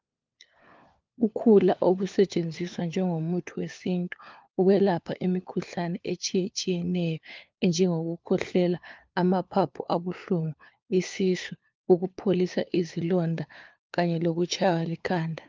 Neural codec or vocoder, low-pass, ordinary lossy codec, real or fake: codec, 16 kHz, 4 kbps, FunCodec, trained on LibriTTS, 50 frames a second; 7.2 kHz; Opus, 24 kbps; fake